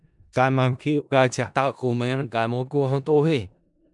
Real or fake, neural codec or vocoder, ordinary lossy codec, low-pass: fake; codec, 16 kHz in and 24 kHz out, 0.4 kbps, LongCat-Audio-Codec, four codebook decoder; MP3, 96 kbps; 10.8 kHz